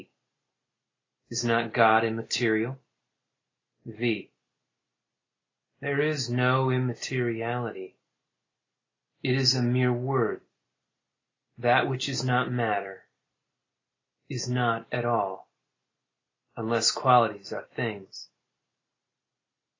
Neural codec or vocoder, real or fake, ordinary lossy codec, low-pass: none; real; AAC, 32 kbps; 7.2 kHz